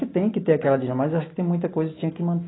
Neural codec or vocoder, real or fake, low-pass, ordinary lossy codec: none; real; 7.2 kHz; AAC, 16 kbps